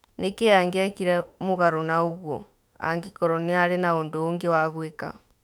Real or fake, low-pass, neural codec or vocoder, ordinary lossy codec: fake; 19.8 kHz; autoencoder, 48 kHz, 32 numbers a frame, DAC-VAE, trained on Japanese speech; none